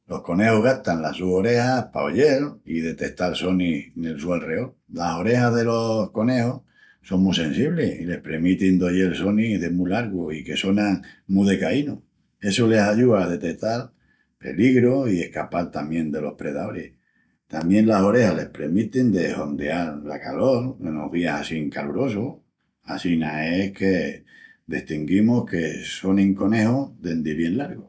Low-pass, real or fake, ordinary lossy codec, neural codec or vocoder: none; real; none; none